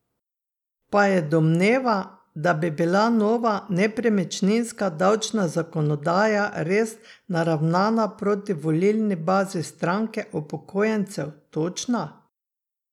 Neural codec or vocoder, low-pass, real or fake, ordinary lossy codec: none; 19.8 kHz; real; none